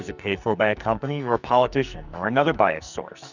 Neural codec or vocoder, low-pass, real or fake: codec, 44.1 kHz, 2.6 kbps, SNAC; 7.2 kHz; fake